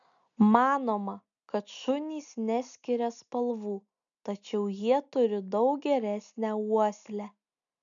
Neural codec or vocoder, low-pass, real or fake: none; 7.2 kHz; real